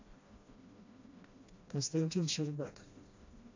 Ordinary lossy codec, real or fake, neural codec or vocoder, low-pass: AAC, 48 kbps; fake; codec, 16 kHz, 1 kbps, FreqCodec, smaller model; 7.2 kHz